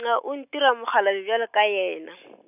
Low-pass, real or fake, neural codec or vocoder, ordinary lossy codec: 3.6 kHz; real; none; none